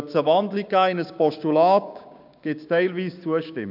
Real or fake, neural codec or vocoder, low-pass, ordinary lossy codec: fake; codec, 16 kHz, 6 kbps, DAC; 5.4 kHz; none